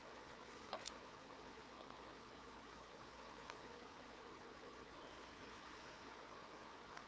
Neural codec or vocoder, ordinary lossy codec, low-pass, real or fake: codec, 16 kHz, 4 kbps, FreqCodec, larger model; none; none; fake